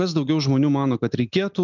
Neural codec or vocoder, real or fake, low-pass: none; real; 7.2 kHz